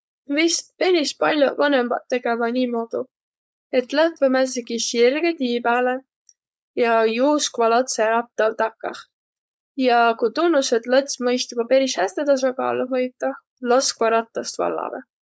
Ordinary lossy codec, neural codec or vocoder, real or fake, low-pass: none; codec, 16 kHz, 4.8 kbps, FACodec; fake; none